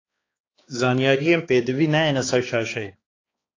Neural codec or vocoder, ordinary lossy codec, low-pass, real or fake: codec, 16 kHz, 2 kbps, X-Codec, HuBERT features, trained on balanced general audio; AAC, 32 kbps; 7.2 kHz; fake